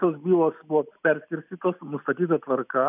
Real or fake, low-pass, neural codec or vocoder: real; 3.6 kHz; none